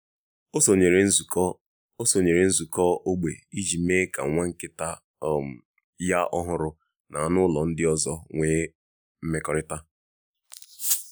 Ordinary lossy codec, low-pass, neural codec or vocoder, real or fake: none; none; none; real